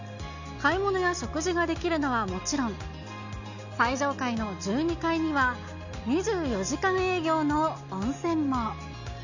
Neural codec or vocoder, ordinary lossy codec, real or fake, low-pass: none; none; real; 7.2 kHz